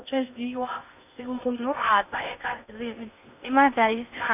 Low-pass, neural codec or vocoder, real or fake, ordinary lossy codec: 3.6 kHz; codec, 16 kHz in and 24 kHz out, 0.6 kbps, FocalCodec, streaming, 2048 codes; fake; none